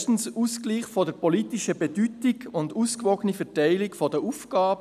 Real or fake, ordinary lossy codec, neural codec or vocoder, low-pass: real; none; none; 14.4 kHz